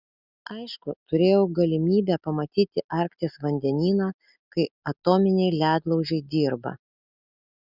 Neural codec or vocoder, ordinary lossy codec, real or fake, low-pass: none; Opus, 64 kbps; real; 5.4 kHz